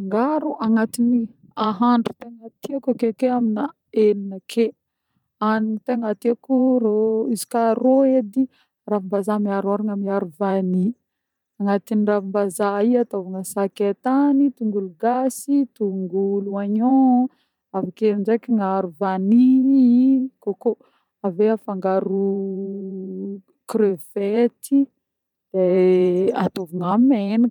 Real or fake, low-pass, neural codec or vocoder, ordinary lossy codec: fake; 19.8 kHz; vocoder, 44.1 kHz, 128 mel bands every 512 samples, BigVGAN v2; none